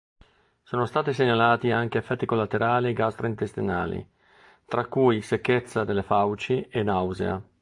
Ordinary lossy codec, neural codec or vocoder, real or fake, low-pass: AAC, 64 kbps; none; real; 10.8 kHz